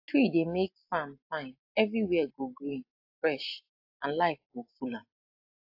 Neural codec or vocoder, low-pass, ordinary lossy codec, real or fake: none; 5.4 kHz; AAC, 32 kbps; real